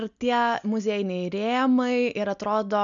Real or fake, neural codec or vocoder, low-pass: real; none; 7.2 kHz